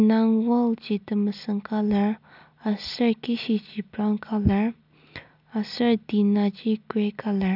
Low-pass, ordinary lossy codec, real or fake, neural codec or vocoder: 5.4 kHz; none; real; none